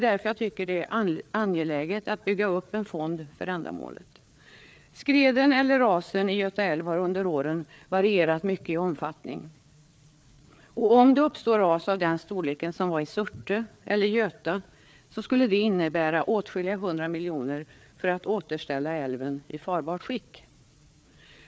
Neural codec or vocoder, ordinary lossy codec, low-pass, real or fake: codec, 16 kHz, 4 kbps, FreqCodec, larger model; none; none; fake